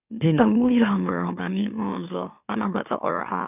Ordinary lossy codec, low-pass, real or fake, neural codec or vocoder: none; 3.6 kHz; fake; autoencoder, 44.1 kHz, a latent of 192 numbers a frame, MeloTTS